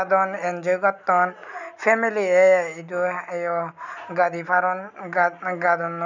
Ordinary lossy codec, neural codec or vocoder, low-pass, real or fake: none; none; 7.2 kHz; real